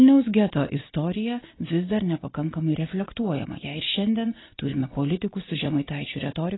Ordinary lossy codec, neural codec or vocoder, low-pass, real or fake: AAC, 16 kbps; none; 7.2 kHz; real